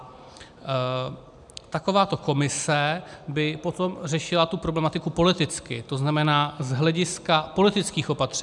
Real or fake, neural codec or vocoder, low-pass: real; none; 10.8 kHz